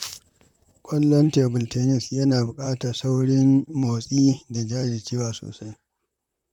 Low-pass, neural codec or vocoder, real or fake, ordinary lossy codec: 19.8 kHz; vocoder, 44.1 kHz, 128 mel bands, Pupu-Vocoder; fake; none